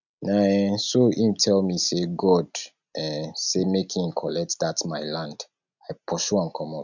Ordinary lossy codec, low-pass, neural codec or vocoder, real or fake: none; 7.2 kHz; none; real